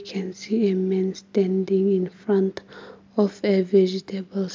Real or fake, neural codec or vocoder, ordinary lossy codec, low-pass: real; none; MP3, 64 kbps; 7.2 kHz